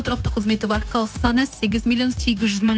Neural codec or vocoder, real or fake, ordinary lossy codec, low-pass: codec, 16 kHz, 0.9 kbps, LongCat-Audio-Codec; fake; none; none